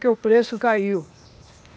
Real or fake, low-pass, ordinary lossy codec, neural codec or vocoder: fake; none; none; codec, 16 kHz, 0.8 kbps, ZipCodec